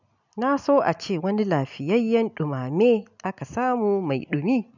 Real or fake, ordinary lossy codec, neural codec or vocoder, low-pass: real; none; none; 7.2 kHz